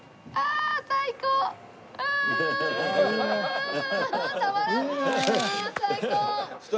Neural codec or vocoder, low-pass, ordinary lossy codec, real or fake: none; none; none; real